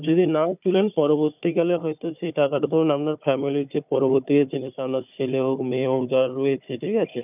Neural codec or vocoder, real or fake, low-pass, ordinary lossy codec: codec, 16 kHz, 4 kbps, FunCodec, trained on Chinese and English, 50 frames a second; fake; 3.6 kHz; none